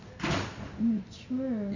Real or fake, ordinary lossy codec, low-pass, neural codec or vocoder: real; none; 7.2 kHz; none